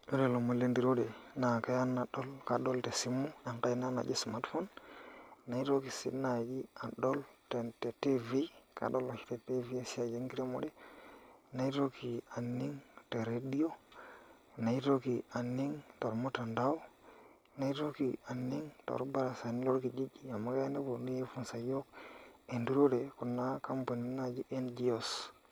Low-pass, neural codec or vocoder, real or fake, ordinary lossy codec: none; none; real; none